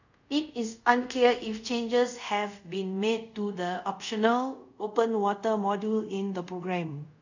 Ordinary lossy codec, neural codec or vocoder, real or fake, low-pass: none; codec, 24 kHz, 0.5 kbps, DualCodec; fake; 7.2 kHz